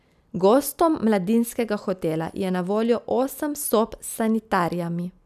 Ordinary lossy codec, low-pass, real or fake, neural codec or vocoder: none; 14.4 kHz; real; none